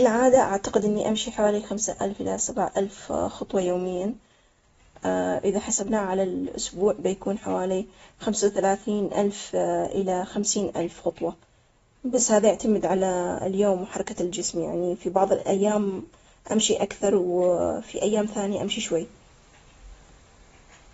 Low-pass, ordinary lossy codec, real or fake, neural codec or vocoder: 19.8 kHz; AAC, 24 kbps; real; none